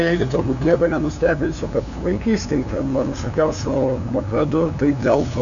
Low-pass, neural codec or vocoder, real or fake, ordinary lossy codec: 7.2 kHz; codec, 16 kHz, 2 kbps, FunCodec, trained on LibriTTS, 25 frames a second; fake; MP3, 96 kbps